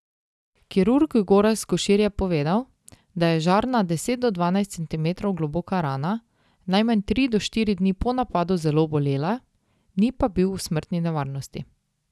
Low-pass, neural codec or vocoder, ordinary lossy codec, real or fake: none; none; none; real